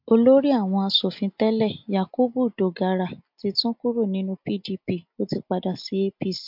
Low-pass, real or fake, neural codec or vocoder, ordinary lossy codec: 5.4 kHz; real; none; MP3, 48 kbps